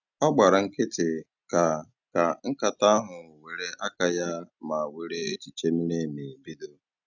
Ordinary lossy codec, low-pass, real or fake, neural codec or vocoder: none; 7.2 kHz; real; none